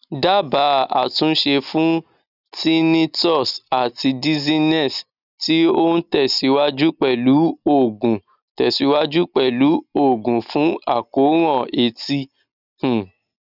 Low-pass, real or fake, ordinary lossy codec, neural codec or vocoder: 5.4 kHz; real; none; none